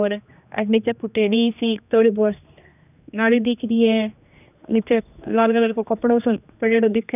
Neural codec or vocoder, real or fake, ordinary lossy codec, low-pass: codec, 16 kHz, 2 kbps, X-Codec, HuBERT features, trained on general audio; fake; none; 3.6 kHz